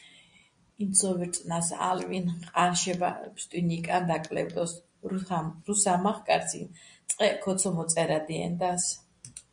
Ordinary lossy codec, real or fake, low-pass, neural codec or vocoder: MP3, 64 kbps; real; 9.9 kHz; none